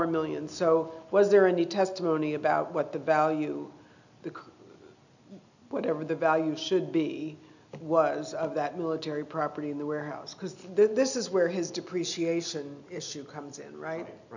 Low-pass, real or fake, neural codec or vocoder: 7.2 kHz; real; none